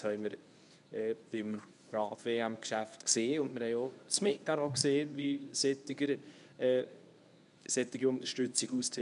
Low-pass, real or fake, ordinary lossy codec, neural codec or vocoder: 10.8 kHz; fake; none; codec, 24 kHz, 0.9 kbps, WavTokenizer, medium speech release version 1